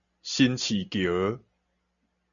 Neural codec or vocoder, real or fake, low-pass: none; real; 7.2 kHz